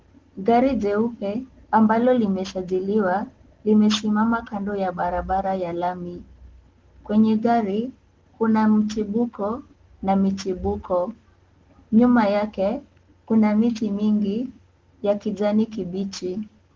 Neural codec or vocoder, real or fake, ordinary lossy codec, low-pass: none; real; Opus, 16 kbps; 7.2 kHz